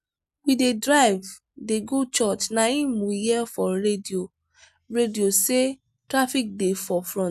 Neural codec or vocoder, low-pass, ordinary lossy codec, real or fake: none; 14.4 kHz; none; real